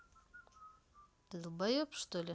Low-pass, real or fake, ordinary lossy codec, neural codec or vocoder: none; real; none; none